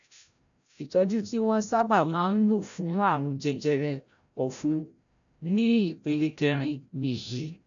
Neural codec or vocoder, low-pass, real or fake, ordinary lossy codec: codec, 16 kHz, 0.5 kbps, FreqCodec, larger model; 7.2 kHz; fake; none